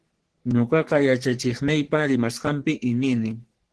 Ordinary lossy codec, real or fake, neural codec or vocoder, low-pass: Opus, 16 kbps; fake; codec, 44.1 kHz, 3.4 kbps, Pupu-Codec; 10.8 kHz